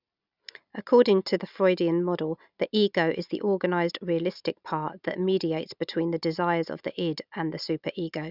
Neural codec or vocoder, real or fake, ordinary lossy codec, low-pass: none; real; none; 5.4 kHz